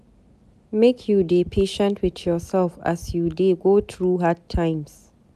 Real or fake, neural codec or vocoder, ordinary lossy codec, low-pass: real; none; none; 14.4 kHz